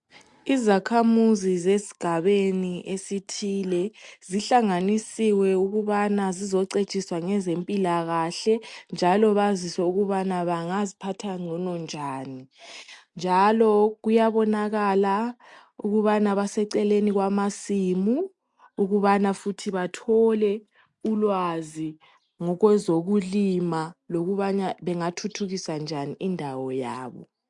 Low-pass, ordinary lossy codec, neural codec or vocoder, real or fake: 10.8 kHz; MP3, 64 kbps; none; real